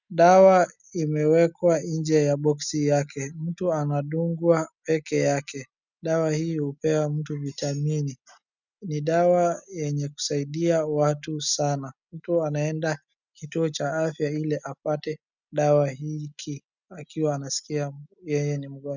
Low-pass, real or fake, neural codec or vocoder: 7.2 kHz; real; none